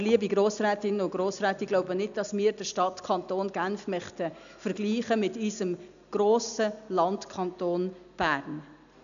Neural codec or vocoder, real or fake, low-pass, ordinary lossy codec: none; real; 7.2 kHz; none